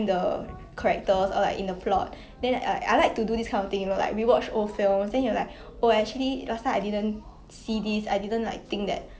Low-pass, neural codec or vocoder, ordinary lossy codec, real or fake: none; none; none; real